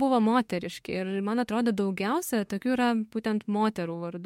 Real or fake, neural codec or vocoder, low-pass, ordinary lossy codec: fake; autoencoder, 48 kHz, 32 numbers a frame, DAC-VAE, trained on Japanese speech; 19.8 kHz; MP3, 64 kbps